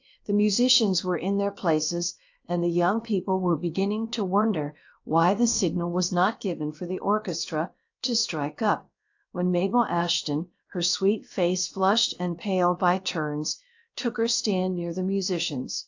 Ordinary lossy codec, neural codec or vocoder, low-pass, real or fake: AAC, 48 kbps; codec, 16 kHz, about 1 kbps, DyCAST, with the encoder's durations; 7.2 kHz; fake